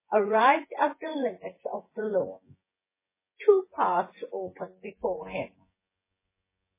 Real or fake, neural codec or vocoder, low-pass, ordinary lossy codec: fake; vocoder, 24 kHz, 100 mel bands, Vocos; 3.6 kHz; MP3, 16 kbps